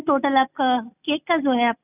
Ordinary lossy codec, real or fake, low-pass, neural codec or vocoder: none; real; 3.6 kHz; none